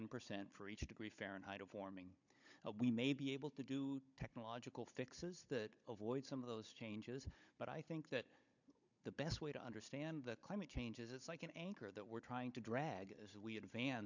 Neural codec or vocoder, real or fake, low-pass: codec, 16 kHz, 16 kbps, FunCodec, trained on Chinese and English, 50 frames a second; fake; 7.2 kHz